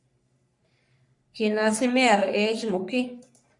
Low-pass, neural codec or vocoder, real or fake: 10.8 kHz; codec, 44.1 kHz, 3.4 kbps, Pupu-Codec; fake